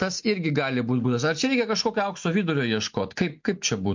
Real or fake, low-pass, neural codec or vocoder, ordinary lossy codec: real; 7.2 kHz; none; MP3, 48 kbps